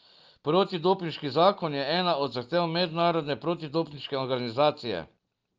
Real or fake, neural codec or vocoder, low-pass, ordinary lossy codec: real; none; 7.2 kHz; Opus, 24 kbps